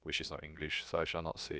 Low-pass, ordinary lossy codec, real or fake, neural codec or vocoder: none; none; fake; codec, 16 kHz, about 1 kbps, DyCAST, with the encoder's durations